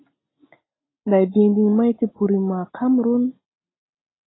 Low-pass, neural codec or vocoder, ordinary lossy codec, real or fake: 7.2 kHz; none; AAC, 16 kbps; real